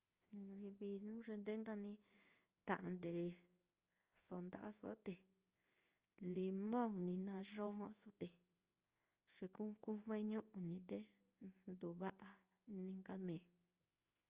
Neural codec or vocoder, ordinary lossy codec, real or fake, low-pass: codec, 16 kHz in and 24 kHz out, 1 kbps, XY-Tokenizer; Opus, 24 kbps; fake; 3.6 kHz